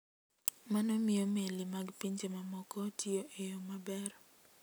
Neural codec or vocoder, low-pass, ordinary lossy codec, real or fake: none; none; none; real